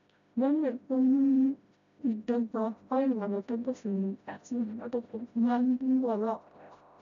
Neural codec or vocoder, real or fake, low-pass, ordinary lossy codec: codec, 16 kHz, 0.5 kbps, FreqCodec, smaller model; fake; 7.2 kHz; none